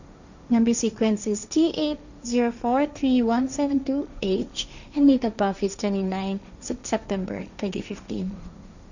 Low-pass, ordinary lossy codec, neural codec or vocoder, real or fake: 7.2 kHz; none; codec, 16 kHz, 1.1 kbps, Voila-Tokenizer; fake